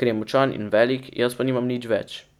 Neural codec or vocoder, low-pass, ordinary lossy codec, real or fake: none; 19.8 kHz; none; real